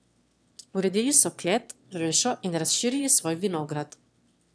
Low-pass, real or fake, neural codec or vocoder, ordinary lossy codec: none; fake; autoencoder, 22.05 kHz, a latent of 192 numbers a frame, VITS, trained on one speaker; none